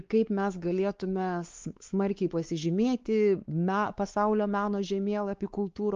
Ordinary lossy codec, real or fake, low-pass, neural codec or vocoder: Opus, 32 kbps; fake; 7.2 kHz; codec, 16 kHz, 4 kbps, X-Codec, WavLM features, trained on Multilingual LibriSpeech